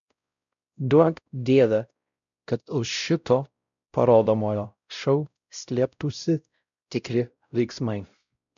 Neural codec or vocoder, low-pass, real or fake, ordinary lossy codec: codec, 16 kHz, 0.5 kbps, X-Codec, WavLM features, trained on Multilingual LibriSpeech; 7.2 kHz; fake; MP3, 96 kbps